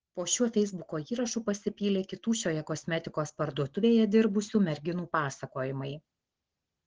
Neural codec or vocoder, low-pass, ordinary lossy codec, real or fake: none; 7.2 kHz; Opus, 16 kbps; real